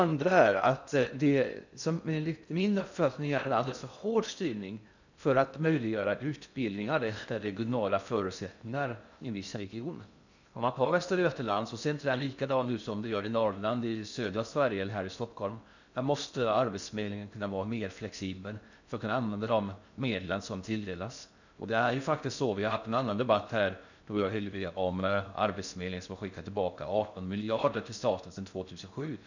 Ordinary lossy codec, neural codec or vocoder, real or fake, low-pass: none; codec, 16 kHz in and 24 kHz out, 0.6 kbps, FocalCodec, streaming, 2048 codes; fake; 7.2 kHz